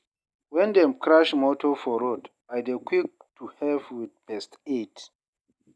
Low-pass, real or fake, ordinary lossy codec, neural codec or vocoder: none; real; none; none